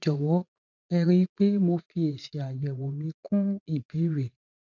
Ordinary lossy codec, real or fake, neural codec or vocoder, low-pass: none; fake; vocoder, 44.1 kHz, 80 mel bands, Vocos; 7.2 kHz